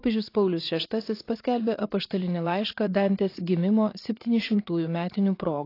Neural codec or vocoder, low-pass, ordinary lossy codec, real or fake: vocoder, 44.1 kHz, 80 mel bands, Vocos; 5.4 kHz; AAC, 32 kbps; fake